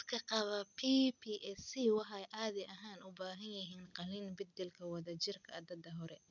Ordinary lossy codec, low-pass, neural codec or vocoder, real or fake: none; 7.2 kHz; none; real